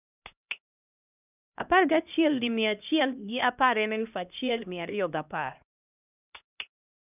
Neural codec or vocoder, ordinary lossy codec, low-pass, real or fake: codec, 16 kHz, 1 kbps, X-Codec, HuBERT features, trained on LibriSpeech; none; 3.6 kHz; fake